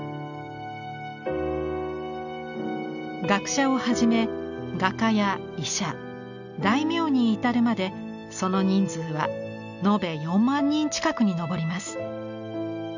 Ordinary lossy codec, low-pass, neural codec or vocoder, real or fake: none; 7.2 kHz; none; real